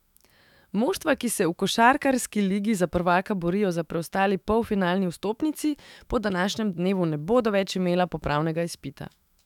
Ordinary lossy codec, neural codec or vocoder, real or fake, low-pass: none; autoencoder, 48 kHz, 128 numbers a frame, DAC-VAE, trained on Japanese speech; fake; 19.8 kHz